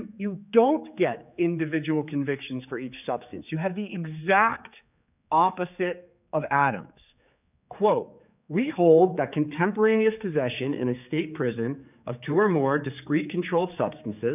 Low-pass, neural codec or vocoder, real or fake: 3.6 kHz; codec, 16 kHz, 4 kbps, X-Codec, HuBERT features, trained on general audio; fake